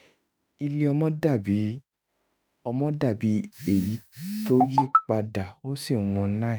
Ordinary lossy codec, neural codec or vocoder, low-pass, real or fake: none; autoencoder, 48 kHz, 32 numbers a frame, DAC-VAE, trained on Japanese speech; none; fake